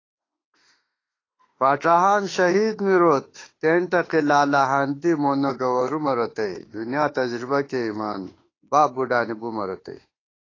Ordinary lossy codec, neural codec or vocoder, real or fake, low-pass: AAC, 32 kbps; autoencoder, 48 kHz, 32 numbers a frame, DAC-VAE, trained on Japanese speech; fake; 7.2 kHz